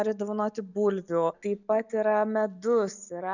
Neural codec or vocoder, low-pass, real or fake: none; 7.2 kHz; real